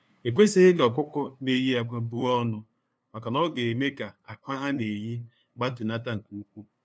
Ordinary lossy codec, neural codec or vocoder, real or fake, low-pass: none; codec, 16 kHz, 2 kbps, FunCodec, trained on LibriTTS, 25 frames a second; fake; none